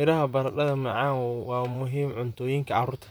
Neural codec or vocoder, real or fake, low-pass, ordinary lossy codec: vocoder, 44.1 kHz, 128 mel bands, Pupu-Vocoder; fake; none; none